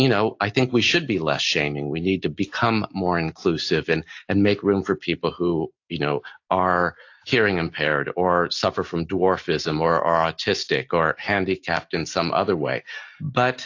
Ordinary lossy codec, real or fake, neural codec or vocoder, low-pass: AAC, 48 kbps; real; none; 7.2 kHz